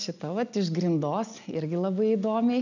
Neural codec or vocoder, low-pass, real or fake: none; 7.2 kHz; real